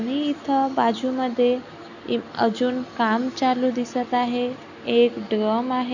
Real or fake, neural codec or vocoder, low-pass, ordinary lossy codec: real; none; 7.2 kHz; none